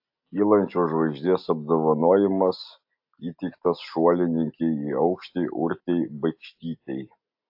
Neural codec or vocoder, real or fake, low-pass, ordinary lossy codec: none; real; 5.4 kHz; AAC, 48 kbps